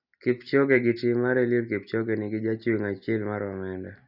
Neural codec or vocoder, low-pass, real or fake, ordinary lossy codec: none; 5.4 kHz; real; none